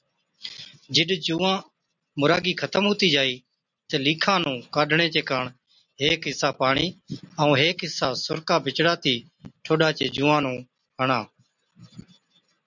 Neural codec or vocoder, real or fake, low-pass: none; real; 7.2 kHz